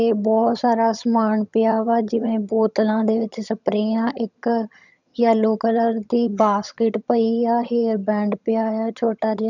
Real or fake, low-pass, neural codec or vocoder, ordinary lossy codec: fake; 7.2 kHz; vocoder, 22.05 kHz, 80 mel bands, HiFi-GAN; none